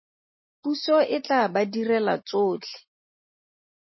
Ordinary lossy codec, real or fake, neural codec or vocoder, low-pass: MP3, 24 kbps; real; none; 7.2 kHz